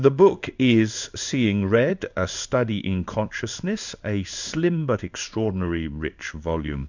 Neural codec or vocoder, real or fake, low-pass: codec, 16 kHz in and 24 kHz out, 1 kbps, XY-Tokenizer; fake; 7.2 kHz